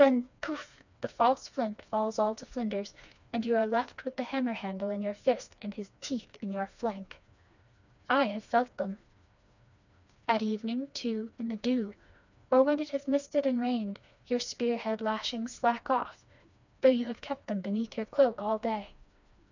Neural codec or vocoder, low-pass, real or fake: codec, 16 kHz, 2 kbps, FreqCodec, smaller model; 7.2 kHz; fake